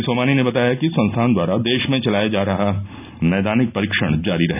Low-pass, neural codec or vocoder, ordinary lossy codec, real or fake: 3.6 kHz; none; none; real